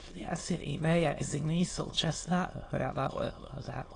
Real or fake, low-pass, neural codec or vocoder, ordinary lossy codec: fake; 9.9 kHz; autoencoder, 22.05 kHz, a latent of 192 numbers a frame, VITS, trained on many speakers; AAC, 32 kbps